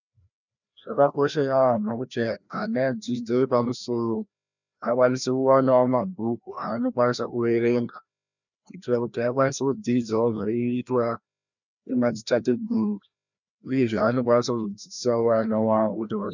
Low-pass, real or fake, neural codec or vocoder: 7.2 kHz; fake; codec, 16 kHz, 1 kbps, FreqCodec, larger model